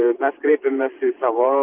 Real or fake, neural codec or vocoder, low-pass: fake; vocoder, 44.1 kHz, 128 mel bands every 512 samples, BigVGAN v2; 3.6 kHz